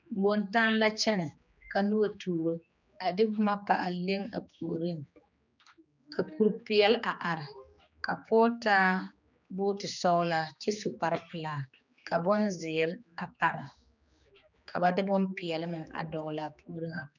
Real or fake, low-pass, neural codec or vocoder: fake; 7.2 kHz; codec, 16 kHz, 2 kbps, X-Codec, HuBERT features, trained on general audio